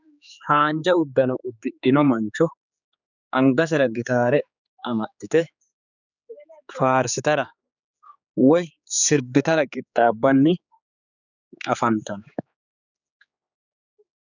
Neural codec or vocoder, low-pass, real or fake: codec, 16 kHz, 4 kbps, X-Codec, HuBERT features, trained on general audio; 7.2 kHz; fake